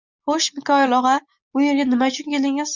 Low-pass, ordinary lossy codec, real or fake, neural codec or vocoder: 7.2 kHz; Opus, 64 kbps; real; none